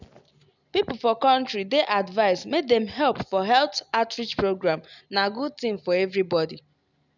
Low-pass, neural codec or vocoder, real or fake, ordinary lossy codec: 7.2 kHz; none; real; none